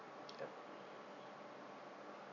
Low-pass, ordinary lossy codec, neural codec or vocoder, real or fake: 7.2 kHz; none; none; real